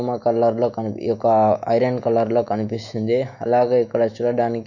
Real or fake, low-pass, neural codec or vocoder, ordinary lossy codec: fake; 7.2 kHz; autoencoder, 48 kHz, 128 numbers a frame, DAC-VAE, trained on Japanese speech; none